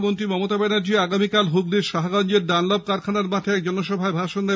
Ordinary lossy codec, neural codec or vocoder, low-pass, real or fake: none; none; none; real